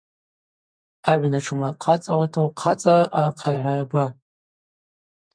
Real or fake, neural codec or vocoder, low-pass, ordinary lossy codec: fake; codec, 32 kHz, 1.9 kbps, SNAC; 9.9 kHz; MP3, 64 kbps